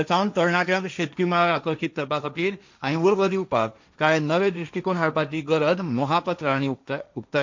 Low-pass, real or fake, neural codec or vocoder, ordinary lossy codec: 7.2 kHz; fake; codec, 16 kHz, 1.1 kbps, Voila-Tokenizer; MP3, 64 kbps